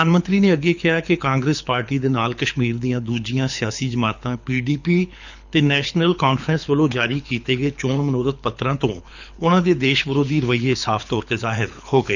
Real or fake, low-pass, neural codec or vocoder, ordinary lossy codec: fake; 7.2 kHz; codec, 24 kHz, 6 kbps, HILCodec; none